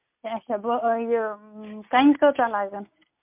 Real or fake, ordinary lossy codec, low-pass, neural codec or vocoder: real; MP3, 32 kbps; 3.6 kHz; none